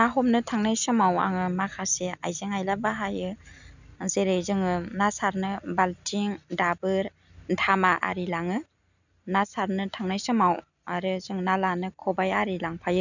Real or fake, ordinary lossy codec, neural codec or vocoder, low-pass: real; none; none; 7.2 kHz